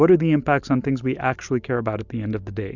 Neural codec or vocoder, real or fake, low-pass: none; real; 7.2 kHz